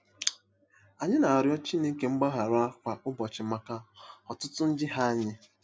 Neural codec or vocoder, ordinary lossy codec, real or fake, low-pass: none; none; real; none